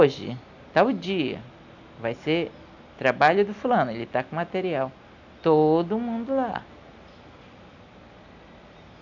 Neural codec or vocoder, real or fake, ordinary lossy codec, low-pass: none; real; none; 7.2 kHz